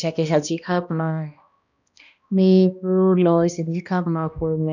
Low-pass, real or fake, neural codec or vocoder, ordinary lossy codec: 7.2 kHz; fake; codec, 16 kHz, 1 kbps, X-Codec, HuBERT features, trained on balanced general audio; none